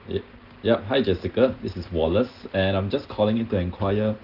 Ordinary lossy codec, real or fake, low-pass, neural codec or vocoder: Opus, 16 kbps; real; 5.4 kHz; none